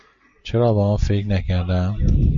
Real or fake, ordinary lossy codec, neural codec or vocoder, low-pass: real; MP3, 48 kbps; none; 7.2 kHz